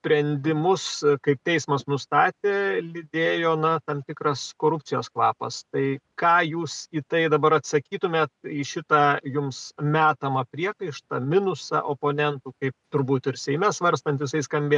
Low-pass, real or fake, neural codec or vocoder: 10.8 kHz; real; none